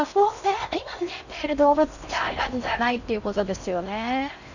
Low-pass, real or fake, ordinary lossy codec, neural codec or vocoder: 7.2 kHz; fake; none; codec, 16 kHz in and 24 kHz out, 0.6 kbps, FocalCodec, streaming, 4096 codes